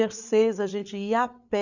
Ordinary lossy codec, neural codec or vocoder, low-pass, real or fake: none; codec, 16 kHz, 8 kbps, FreqCodec, larger model; 7.2 kHz; fake